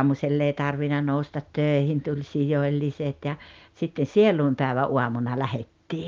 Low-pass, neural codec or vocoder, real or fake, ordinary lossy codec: 7.2 kHz; none; real; Opus, 24 kbps